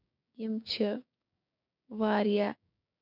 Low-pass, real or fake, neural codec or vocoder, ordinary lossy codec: 5.4 kHz; fake; codec, 16 kHz, 6 kbps, DAC; none